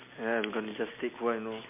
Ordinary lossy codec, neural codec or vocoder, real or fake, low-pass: MP3, 24 kbps; none; real; 3.6 kHz